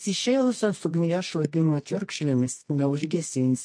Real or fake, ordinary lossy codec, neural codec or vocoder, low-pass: fake; MP3, 48 kbps; codec, 24 kHz, 0.9 kbps, WavTokenizer, medium music audio release; 9.9 kHz